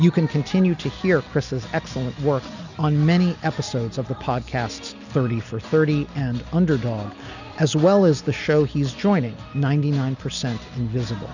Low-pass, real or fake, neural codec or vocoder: 7.2 kHz; real; none